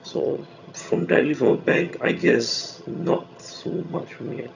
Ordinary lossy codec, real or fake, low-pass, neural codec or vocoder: none; fake; 7.2 kHz; vocoder, 22.05 kHz, 80 mel bands, HiFi-GAN